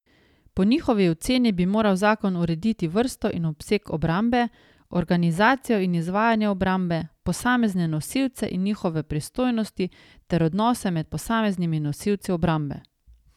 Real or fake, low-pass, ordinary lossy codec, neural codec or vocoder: real; 19.8 kHz; none; none